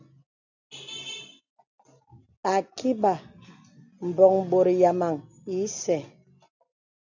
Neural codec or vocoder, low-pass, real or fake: none; 7.2 kHz; real